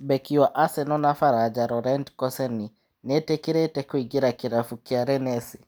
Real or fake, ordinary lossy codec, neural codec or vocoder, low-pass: real; none; none; none